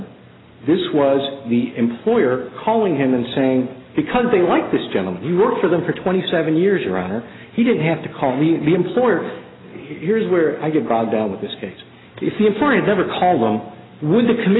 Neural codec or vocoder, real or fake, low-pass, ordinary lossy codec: none; real; 7.2 kHz; AAC, 16 kbps